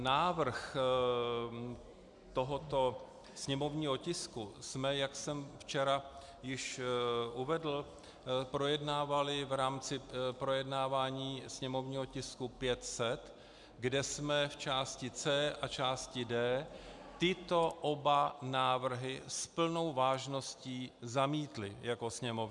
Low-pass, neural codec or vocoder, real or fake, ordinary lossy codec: 10.8 kHz; none; real; MP3, 96 kbps